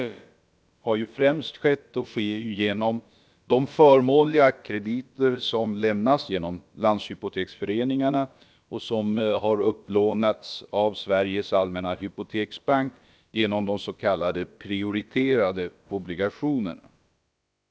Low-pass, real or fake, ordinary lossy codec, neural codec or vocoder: none; fake; none; codec, 16 kHz, about 1 kbps, DyCAST, with the encoder's durations